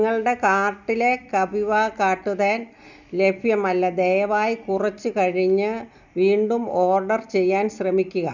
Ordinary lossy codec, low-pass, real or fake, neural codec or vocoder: none; 7.2 kHz; real; none